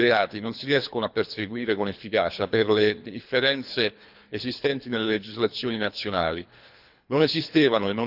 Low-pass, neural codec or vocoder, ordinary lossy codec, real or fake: 5.4 kHz; codec, 24 kHz, 3 kbps, HILCodec; none; fake